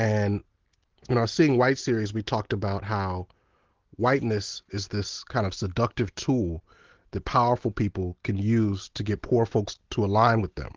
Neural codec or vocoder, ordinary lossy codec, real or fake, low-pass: none; Opus, 16 kbps; real; 7.2 kHz